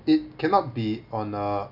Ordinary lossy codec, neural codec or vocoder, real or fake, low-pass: none; none; real; 5.4 kHz